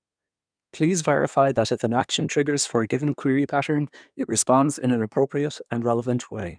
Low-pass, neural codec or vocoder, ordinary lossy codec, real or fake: 9.9 kHz; codec, 24 kHz, 1 kbps, SNAC; none; fake